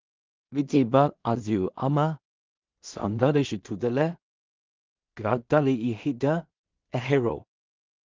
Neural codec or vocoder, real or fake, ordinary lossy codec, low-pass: codec, 16 kHz in and 24 kHz out, 0.4 kbps, LongCat-Audio-Codec, two codebook decoder; fake; Opus, 24 kbps; 7.2 kHz